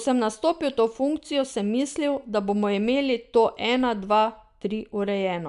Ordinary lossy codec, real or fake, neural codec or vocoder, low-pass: none; real; none; 10.8 kHz